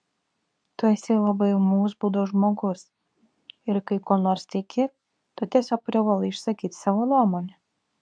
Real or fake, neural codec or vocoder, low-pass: fake; codec, 24 kHz, 0.9 kbps, WavTokenizer, medium speech release version 2; 9.9 kHz